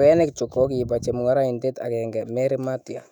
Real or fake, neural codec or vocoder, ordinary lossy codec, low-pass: fake; autoencoder, 48 kHz, 128 numbers a frame, DAC-VAE, trained on Japanese speech; none; 19.8 kHz